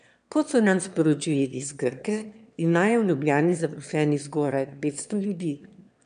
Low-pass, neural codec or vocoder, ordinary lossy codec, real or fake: 9.9 kHz; autoencoder, 22.05 kHz, a latent of 192 numbers a frame, VITS, trained on one speaker; MP3, 96 kbps; fake